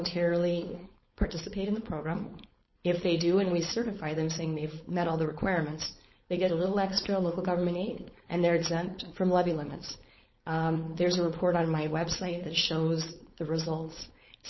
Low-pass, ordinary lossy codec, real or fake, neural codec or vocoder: 7.2 kHz; MP3, 24 kbps; fake; codec, 16 kHz, 4.8 kbps, FACodec